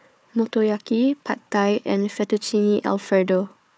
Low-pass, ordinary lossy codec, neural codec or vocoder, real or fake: none; none; codec, 16 kHz, 4 kbps, FunCodec, trained on Chinese and English, 50 frames a second; fake